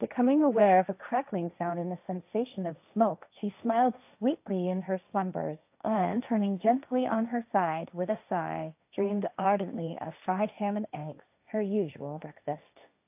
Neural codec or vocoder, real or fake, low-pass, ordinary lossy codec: codec, 16 kHz, 1.1 kbps, Voila-Tokenizer; fake; 3.6 kHz; AAC, 32 kbps